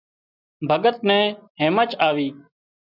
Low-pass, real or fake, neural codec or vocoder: 5.4 kHz; real; none